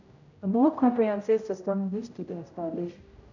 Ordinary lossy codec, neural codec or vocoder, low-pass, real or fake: none; codec, 16 kHz, 0.5 kbps, X-Codec, HuBERT features, trained on general audio; 7.2 kHz; fake